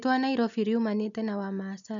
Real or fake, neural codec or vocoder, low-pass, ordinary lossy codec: real; none; 7.2 kHz; none